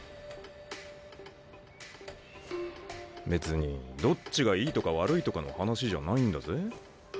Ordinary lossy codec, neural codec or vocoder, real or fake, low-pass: none; none; real; none